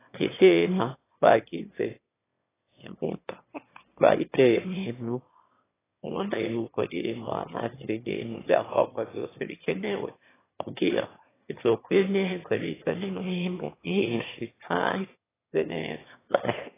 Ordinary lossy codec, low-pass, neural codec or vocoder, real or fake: AAC, 16 kbps; 3.6 kHz; autoencoder, 22.05 kHz, a latent of 192 numbers a frame, VITS, trained on one speaker; fake